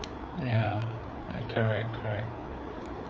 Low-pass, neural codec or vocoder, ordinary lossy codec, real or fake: none; codec, 16 kHz, 4 kbps, FreqCodec, larger model; none; fake